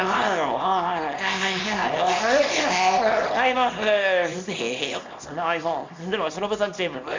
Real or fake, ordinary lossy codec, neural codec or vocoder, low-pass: fake; MP3, 64 kbps; codec, 24 kHz, 0.9 kbps, WavTokenizer, small release; 7.2 kHz